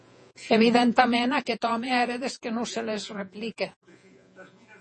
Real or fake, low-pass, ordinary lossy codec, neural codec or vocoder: fake; 10.8 kHz; MP3, 32 kbps; vocoder, 48 kHz, 128 mel bands, Vocos